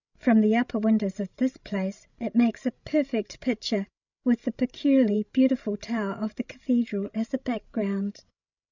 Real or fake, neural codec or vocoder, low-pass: fake; codec, 16 kHz, 16 kbps, FreqCodec, larger model; 7.2 kHz